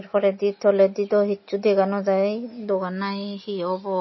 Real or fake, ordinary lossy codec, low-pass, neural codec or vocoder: real; MP3, 24 kbps; 7.2 kHz; none